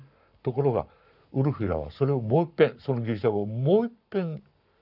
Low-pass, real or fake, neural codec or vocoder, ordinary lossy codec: 5.4 kHz; fake; codec, 44.1 kHz, 7.8 kbps, Pupu-Codec; none